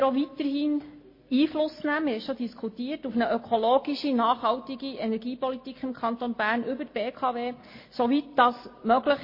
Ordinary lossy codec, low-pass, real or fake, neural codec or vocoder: MP3, 24 kbps; 5.4 kHz; real; none